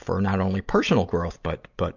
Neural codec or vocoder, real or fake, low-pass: none; real; 7.2 kHz